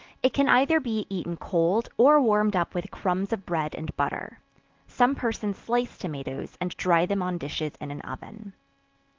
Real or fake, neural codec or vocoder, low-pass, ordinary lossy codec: real; none; 7.2 kHz; Opus, 16 kbps